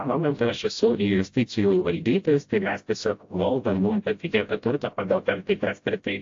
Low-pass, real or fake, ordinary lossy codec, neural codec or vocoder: 7.2 kHz; fake; AAC, 48 kbps; codec, 16 kHz, 0.5 kbps, FreqCodec, smaller model